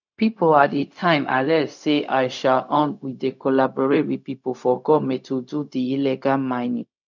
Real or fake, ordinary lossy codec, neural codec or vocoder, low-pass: fake; none; codec, 16 kHz, 0.4 kbps, LongCat-Audio-Codec; 7.2 kHz